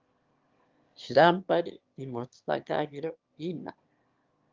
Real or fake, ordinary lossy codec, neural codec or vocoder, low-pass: fake; Opus, 32 kbps; autoencoder, 22.05 kHz, a latent of 192 numbers a frame, VITS, trained on one speaker; 7.2 kHz